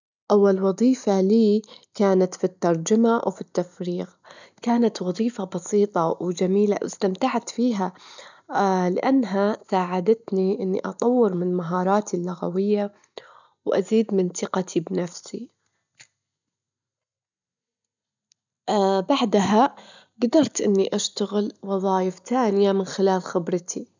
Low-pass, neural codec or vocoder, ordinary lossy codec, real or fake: 7.2 kHz; none; none; real